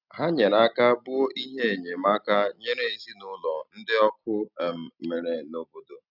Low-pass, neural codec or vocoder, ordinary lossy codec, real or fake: 5.4 kHz; none; none; real